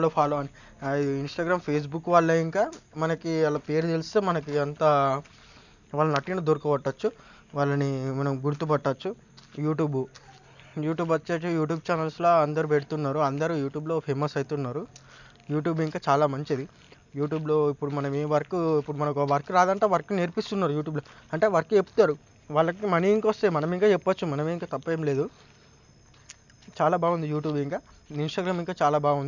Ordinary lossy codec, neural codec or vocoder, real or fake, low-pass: none; none; real; 7.2 kHz